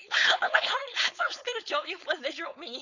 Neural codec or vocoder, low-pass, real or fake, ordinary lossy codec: codec, 16 kHz, 4.8 kbps, FACodec; 7.2 kHz; fake; none